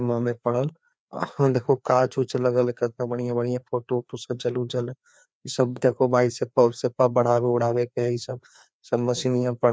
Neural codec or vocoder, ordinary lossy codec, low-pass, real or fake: codec, 16 kHz, 2 kbps, FreqCodec, larger model; none; none; fake